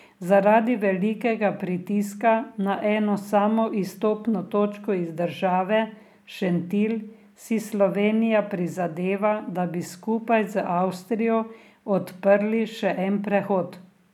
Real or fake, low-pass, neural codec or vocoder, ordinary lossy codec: real; 19.8 kHz; none; none